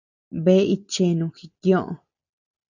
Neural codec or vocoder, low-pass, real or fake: none; 7.2 kHz; real